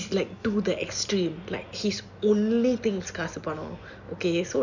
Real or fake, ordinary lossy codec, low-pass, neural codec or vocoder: real; none; 7.2 kHz; none